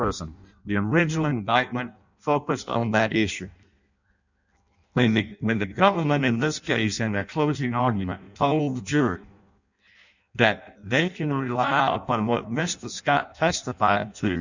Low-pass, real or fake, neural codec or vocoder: 7.2 kHz; fake; codec, 16 kHz in and 24 kHz out, 0.6 kbps, FireRedTTS-2 codec